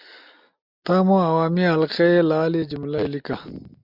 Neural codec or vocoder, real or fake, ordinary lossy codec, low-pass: none; real; MP3, 48 kbps; 5.4 kHz